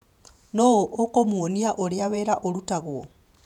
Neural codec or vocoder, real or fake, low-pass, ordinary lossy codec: vocoder, 48 kHz, 128 mel bands, Vocos; fake; 19.8 kHz; none